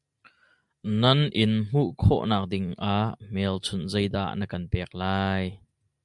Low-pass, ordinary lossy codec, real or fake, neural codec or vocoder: 10.8 kHz; MP3, 96 kbps; real; none